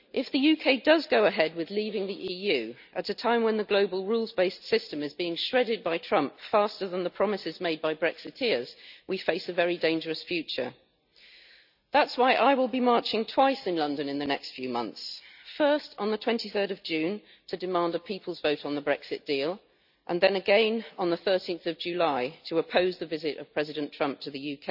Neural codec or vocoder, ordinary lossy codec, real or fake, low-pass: none; none; real; 5.4 kHz